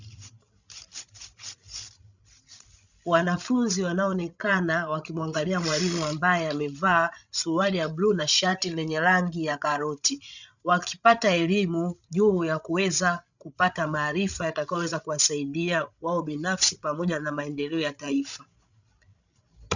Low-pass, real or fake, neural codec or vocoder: 7.2 kHz; fake; codec, 16 kHz, 16 kbps, FreqCodec, larger model